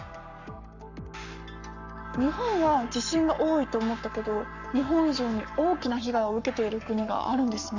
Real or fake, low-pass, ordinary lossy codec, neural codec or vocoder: fake; 7.2 kHz; none; codec, 44.1 kHz, 7.8 kbps, Pupu-Codec